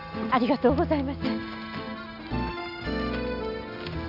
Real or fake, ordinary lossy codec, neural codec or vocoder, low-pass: real; none; none; 5.4 kHz